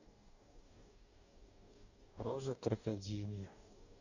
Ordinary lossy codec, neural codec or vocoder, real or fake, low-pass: none; codec, 44.1 kHz, 2.6 kbps, DAC; fake; 7.2 kHz